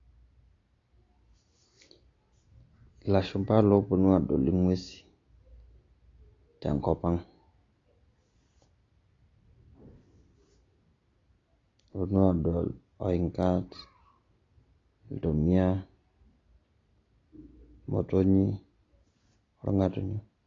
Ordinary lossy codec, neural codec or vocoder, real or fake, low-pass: AAC, 32 kbps; none; real; 7.2 kHz